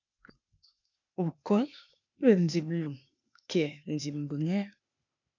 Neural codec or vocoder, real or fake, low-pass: codec, 16 kHz, 0.8 kbps, ZipCodec; fake; 7.2 kHz